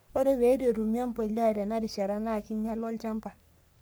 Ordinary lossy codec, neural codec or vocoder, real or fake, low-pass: none; codec, 44.1 kHz, 3.4 kbps, Pupu-Codec; fake; none